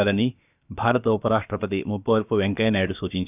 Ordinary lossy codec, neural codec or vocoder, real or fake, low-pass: none; codec, 16 kHz, about 1 kbps, DyCAST, with the encoder's durations; fake; 3.6 kHz